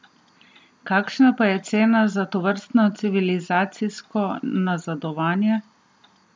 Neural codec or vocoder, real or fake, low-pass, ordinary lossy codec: none; real; none; none